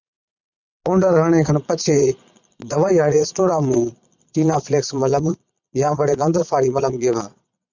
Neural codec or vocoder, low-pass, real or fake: vocoder, 22.05 kHz, 80 mel bands, Vocos; 7.2 kHz; fake